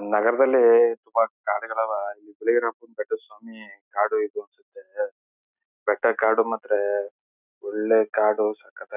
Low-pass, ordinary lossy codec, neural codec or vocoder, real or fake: 3.6 kHz; none; none; real